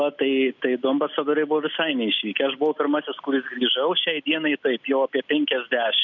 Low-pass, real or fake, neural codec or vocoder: 7.2 kHz; real; none